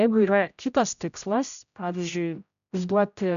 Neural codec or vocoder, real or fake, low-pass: codec, 16 kHz, 0.5 kbps, X-Codec, HuBERT features, trained on general audio; fake; 7.2 kHz